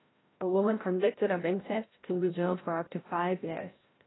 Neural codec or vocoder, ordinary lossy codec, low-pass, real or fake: codec, 16 kHz, 0.5 kbps, FreqCodec, larger model; AAC, 16 kbps; 7.2 kHz; fake